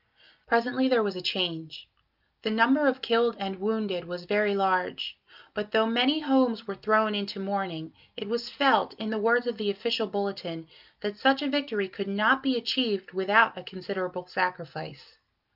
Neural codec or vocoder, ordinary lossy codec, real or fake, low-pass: none; Opus, 24 kbps; real; 5.4 kHz